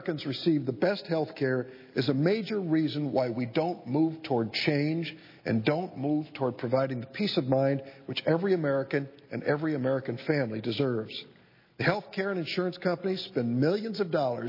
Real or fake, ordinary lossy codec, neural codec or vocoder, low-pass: real; MP3, 24 kbps; none; 5.4 kHz